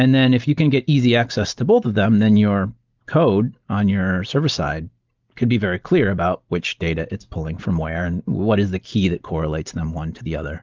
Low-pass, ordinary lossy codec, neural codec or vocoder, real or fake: 7.2 kHz; Opus, 32 kbps; none; real